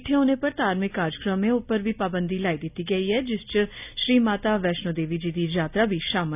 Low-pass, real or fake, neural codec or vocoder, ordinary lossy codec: 3.6 kHz; real; none; none